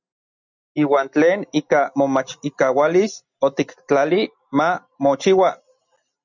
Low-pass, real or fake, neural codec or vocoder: 7.2 kHz; real; none